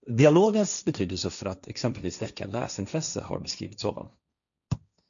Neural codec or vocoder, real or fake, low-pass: codec, 16 kHz, 1.1 kbps, Voila-Tokenizer; fake; 7.2 kHz